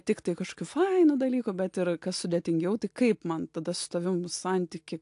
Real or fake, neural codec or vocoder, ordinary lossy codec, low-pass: real; none; AAC, 64 kbps; 10.8 kHz